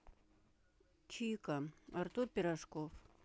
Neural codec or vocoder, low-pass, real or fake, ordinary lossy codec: none; none; real; none